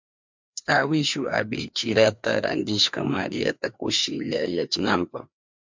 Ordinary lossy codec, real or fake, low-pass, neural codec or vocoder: MP3, 64 kbps; fake; 7.2 kHz; codec, 16 kHz, 2 kbps, FreqCodec, larger model